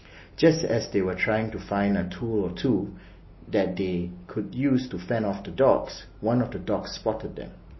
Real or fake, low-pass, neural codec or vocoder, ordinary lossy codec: real; 7.2 kHz; none; MP3, 24 kbps